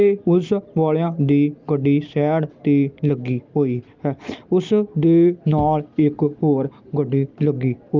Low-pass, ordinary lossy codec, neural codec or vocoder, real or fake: 7.2 kHz; Opus, 32 kbps; none; real